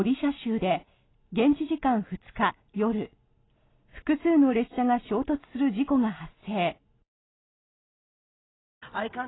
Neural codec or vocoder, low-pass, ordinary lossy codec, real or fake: none; 7.2 kHz; AAC, 16 kbps; real